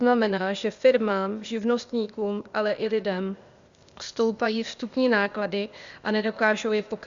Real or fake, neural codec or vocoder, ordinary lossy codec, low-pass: fake; codec, 16 kHz, 0.8 kbps, ZipCodec; Opus, 64 kbps; 7.2 kHz